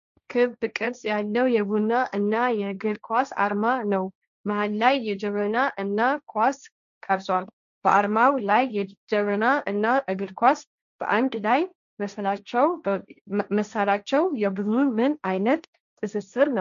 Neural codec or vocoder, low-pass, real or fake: codec, 16 kHz, 1.1 kbps, Voila-Tokenizer; 7.2 kHz; fake